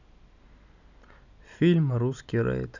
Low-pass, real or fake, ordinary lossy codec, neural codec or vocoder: 7.2 kHz; real; none; none